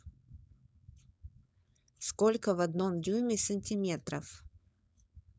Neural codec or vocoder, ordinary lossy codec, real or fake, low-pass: codec, 16 kHz, 4.8 kbps, FACodec; none; fake; none